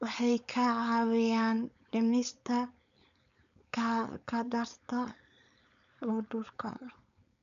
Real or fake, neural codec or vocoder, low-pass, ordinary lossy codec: fake; codec, 16 kHz, 4.8 kbps, FACodec; 7.2 kHz; none